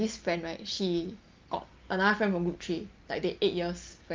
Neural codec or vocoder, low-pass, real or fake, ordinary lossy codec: none; 7.2 kHz; real; Opus, 32 kbps